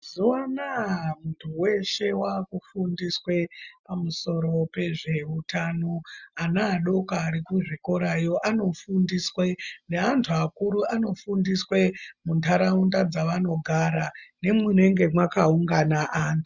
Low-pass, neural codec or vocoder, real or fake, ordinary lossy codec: 7.2 kHz; none; real; Opus, 64 kbps